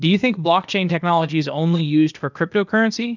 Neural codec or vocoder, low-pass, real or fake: codec, 16 kHz, 0.8 kbps, ZipCodec; 7.2 kHz; fake